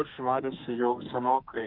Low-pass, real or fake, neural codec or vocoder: 5.4 kHz; fake; codec, 32 kHz, 1.9 kbps, SNAC